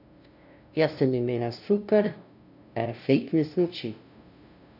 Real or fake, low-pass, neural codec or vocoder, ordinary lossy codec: fake; 5.4 kHz; codec, 16 kHz, 0.5 kbps, FunCodec, trained on LibriTTS, 25 frames a second; none